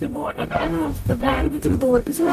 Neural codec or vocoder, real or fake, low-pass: codec, 44.1 kHz, 0.9 kbps, DAC; fake; 14.4 kHz